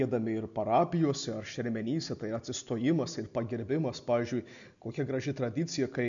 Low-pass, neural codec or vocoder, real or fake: 7.2 kHz; none; real